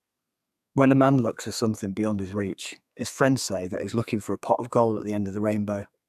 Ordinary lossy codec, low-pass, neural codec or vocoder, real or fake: none; 14.4 kHz; codec, 32 kHz, 1.9 kbps, SNAC; fake